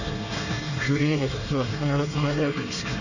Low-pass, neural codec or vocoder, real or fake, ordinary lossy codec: 7.2 kHz; codec, 24 kHz, 1 kbps, SNAC; fake; none